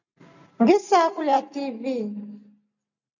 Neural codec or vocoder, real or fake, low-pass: none; real; 7.2 kHz